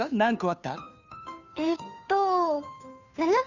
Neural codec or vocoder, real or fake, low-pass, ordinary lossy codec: codec, 16 kHz, 2 kbps, FunCodec, trained on Chinese and English, 25 frames a second; fake; 7.2 kHz; none